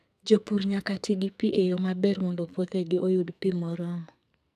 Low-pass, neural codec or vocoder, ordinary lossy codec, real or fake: 14.4 kHz; codec, 44.1 kHz, 2.6 kbps, SNAC; none; fake